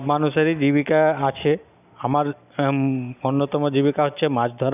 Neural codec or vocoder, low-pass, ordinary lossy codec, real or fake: none; 3.6 kHz; none; real